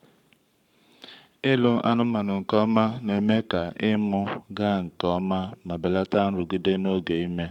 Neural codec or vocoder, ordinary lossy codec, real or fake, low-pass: codec, 44.1 kHz, 7.8 kbps, Pupu-Codec; none; fake; 19.8 kHz